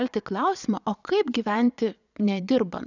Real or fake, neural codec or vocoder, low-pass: fake; vocoder, 22.05 kHz, 80 mel bands, Vocos; 7.2 kHz